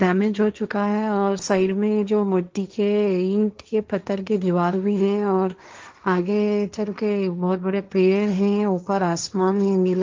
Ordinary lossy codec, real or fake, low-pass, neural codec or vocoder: Opus, 24 kbps; fake; 7.2 kHz; codec, 16 kHz, 1.1 kbps, Voila-Tokenizer